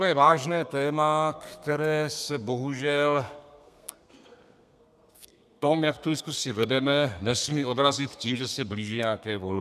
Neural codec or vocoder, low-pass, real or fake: codec, 32 kHz, 1.9 kbps, SNAC; 14.4 kHz; fake